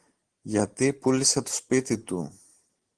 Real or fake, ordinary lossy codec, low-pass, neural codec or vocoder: real; Opus, 16 kbps; 9.9 kHz; none